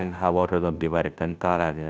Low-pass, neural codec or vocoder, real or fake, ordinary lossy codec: none; codec, 16 kHz, 0.5 kbps, FunCodec, trained on Chinese and English, 25 frames a second; fake; none